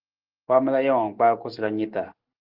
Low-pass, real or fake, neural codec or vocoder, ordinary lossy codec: 5.4 kHz; real; none; Opus, 16 kbps